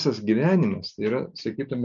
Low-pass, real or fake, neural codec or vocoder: 7.2 kHz; real; none